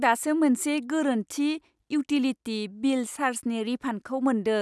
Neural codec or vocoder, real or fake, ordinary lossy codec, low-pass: none; real; none; none